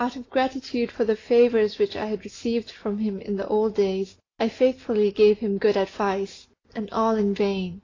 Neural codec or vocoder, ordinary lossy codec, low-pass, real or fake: none; AAC, 32 kbps; 7.2 kHz; real